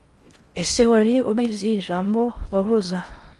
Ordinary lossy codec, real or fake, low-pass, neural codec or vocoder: Opus, 32 kbps; fake; 10.8 kHz; codec, 16 kHz in and 24 kHz out, 0.6 kbps, FocalCodec, streaming, 4096 codes